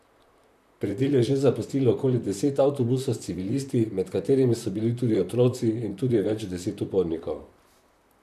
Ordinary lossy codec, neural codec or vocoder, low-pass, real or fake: none; vocoder, 44.1 kHz, 128 mel bands, Pupu-Vocoder; 14.4 kHz; fake